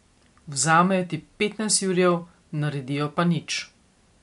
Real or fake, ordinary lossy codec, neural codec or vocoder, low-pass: real; MP3, 64 kbps; none; 10.8 kHz